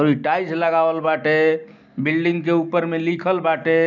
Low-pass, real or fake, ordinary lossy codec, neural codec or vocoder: 7.2 kHz; real; none; none